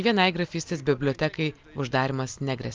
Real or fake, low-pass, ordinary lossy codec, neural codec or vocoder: real; 7.2 kHz; Opus, 24 kbps; none